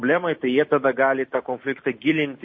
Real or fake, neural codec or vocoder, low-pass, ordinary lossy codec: real; none; 7.2 kHz; MP3, 32 kbps